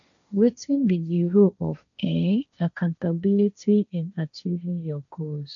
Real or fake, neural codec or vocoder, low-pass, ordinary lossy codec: fake; codec, 16 kHz, 1.1 kbps, Voila-Tokenizer; 7.2 kHz; none